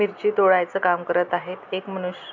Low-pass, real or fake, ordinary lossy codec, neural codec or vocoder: 7.2 kHz; real; none; none